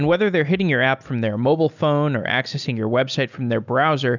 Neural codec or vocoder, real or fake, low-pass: none; real; 7.2 kHz